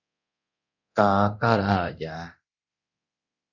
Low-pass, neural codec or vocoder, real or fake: 7.2 kHz; codec, 24 kHz, 0.9 kbps, DualCodec; fake